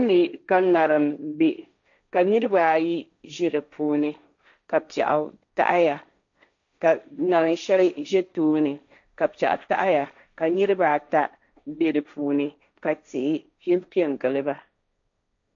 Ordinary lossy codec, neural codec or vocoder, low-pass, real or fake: AAC, 64 kbps; codec, 16 kHz, 1.1 kbps, Voila-Tokenizer; 7.2 kHz; fake